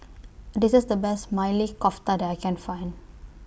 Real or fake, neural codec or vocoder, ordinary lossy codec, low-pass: real; none; none; none